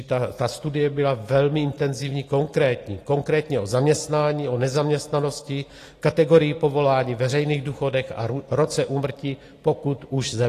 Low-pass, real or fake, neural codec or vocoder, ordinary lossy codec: 14.4 kHz; real; none; AAC, 48 kbps